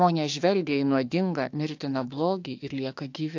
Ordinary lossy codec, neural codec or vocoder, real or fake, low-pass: AAC, 48 kbps; autoencoder, 48 kHz, 32 numbers a frame, DAC-VAE, trained on Japanese speech; fake; 7.2 kHz